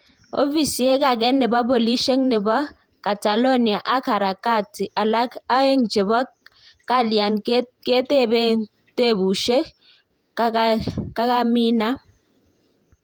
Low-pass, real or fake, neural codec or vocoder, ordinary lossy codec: 19.8 kHz; fake; vocoder, 48 kHz, 128 mel bands, Vocos; Opus, 32 kbps